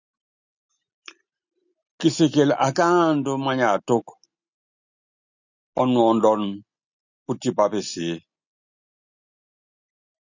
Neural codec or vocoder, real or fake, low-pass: none; real; 7.2 kHz